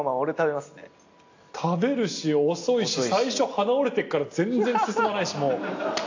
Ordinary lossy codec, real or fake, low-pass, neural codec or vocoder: AAC, 48 kbps; real; 7.2 kHz; none